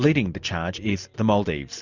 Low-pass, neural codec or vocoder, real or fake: 7.2 kHz; none; real